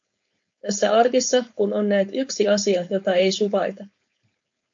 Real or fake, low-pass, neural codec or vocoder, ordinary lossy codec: fake; 7.2 kHz; codec, 16 kHz, 4.8 kbps, FACodec; MP3, 48 kbps